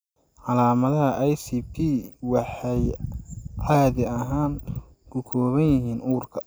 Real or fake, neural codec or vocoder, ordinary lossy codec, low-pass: real; none; none; none